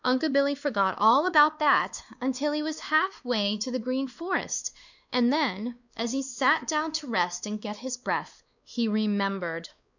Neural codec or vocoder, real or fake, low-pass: codec, 16 kHz, 2 kbps, X-Codec, WavLM features, trained on Multilingual LibriSpeech; fake; 7.2 kHz